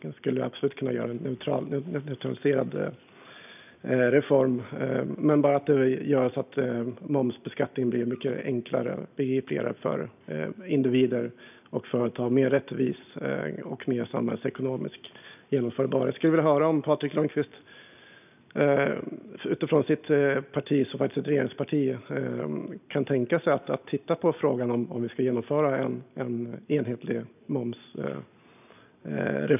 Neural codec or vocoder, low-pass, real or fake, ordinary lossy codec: none; 3.6 kHz; real; none